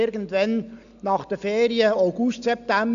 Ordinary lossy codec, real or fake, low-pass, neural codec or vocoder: none; real; 7.2 kHz; none